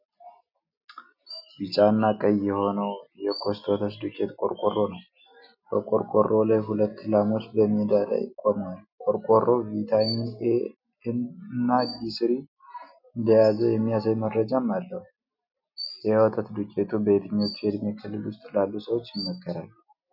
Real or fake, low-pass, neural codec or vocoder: real; 5.4 kHz; none